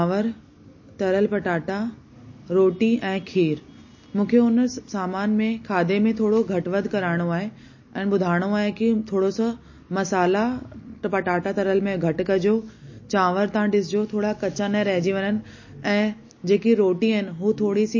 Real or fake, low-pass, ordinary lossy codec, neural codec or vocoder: real; 7.2 kHz; MP3, 32 kbps; none